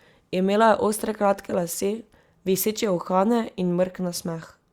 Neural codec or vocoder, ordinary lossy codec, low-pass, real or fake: none; Opus, 64 kbps; 19.8 kHz; real